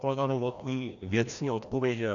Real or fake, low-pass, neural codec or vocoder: fake; 7.2 kHz; codec, 16 kHz, 1 kbps, FreqCodec, larger model